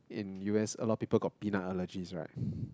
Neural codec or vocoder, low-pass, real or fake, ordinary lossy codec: none; none; real; none